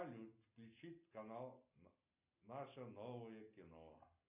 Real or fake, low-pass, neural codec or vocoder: real; 3.6 kHz; none